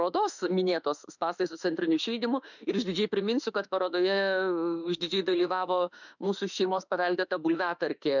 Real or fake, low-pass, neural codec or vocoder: fake; 7.2 kHz; autoencoder, 48 kHz, 32 numbers a frame, DAC-VAE, trained on Japanese speech